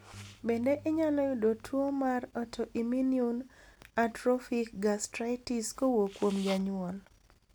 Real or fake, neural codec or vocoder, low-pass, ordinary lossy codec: real; none; none; none